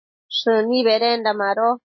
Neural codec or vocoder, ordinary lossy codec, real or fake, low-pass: none; MP3, 24 kbps; real; 7.2 kHz